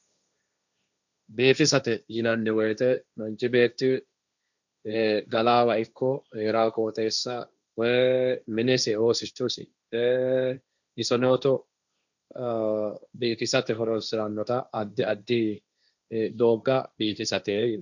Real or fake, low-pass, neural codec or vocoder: fake; 7.2 kHz; codec, 16 kHz, 1.1 kbps, Voila-Tokenizer